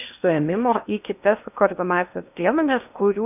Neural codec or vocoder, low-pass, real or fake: codec, 16 kHz in and 24 kHz out, 0.8 kbps, FocalCodec, streaming, 65536 codes; 3.6 kHz; fake